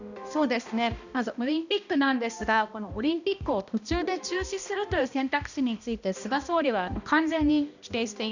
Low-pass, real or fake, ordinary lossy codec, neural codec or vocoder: 7.2 kHz; fake; none; codec, 16 kHz, 1 kbps, X-Codec, HuBERT features, trained on balanced general audio